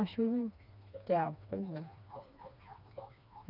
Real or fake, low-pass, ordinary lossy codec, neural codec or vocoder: fake; 5.4 kHz; none; codec, 16 kHz, 2 kbps, FreqCodec, smaller model